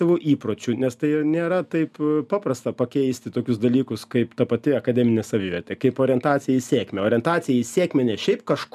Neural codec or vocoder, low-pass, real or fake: none; 14.4 kHz; real